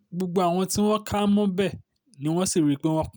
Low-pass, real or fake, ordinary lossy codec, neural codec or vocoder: none; real; none; none